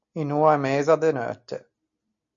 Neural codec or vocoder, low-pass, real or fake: none; 7.2 kHz; real